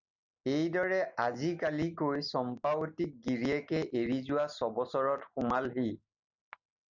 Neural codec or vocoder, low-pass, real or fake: none; 7.2 kHz; real